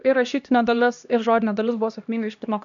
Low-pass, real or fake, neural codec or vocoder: 7.2 kHz; fake; codec, 16 kHz, 1 kbps, X-Codec, HuBERT features, trained on LibriSpeech